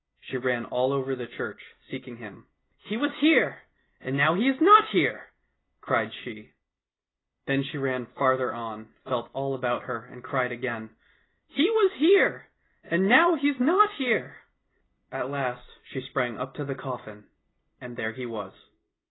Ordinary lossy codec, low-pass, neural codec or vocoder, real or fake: AAC, 16 kbps; 7.2 kHz; none; real